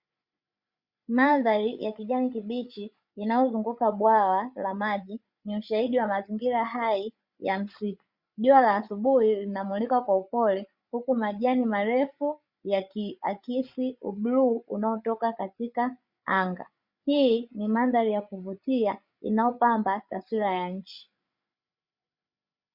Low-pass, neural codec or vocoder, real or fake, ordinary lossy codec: 5.4 kHz; codec, 16 kHz, 8 kbps, FreqCodec, larger model; fake; Opus, 64 kbps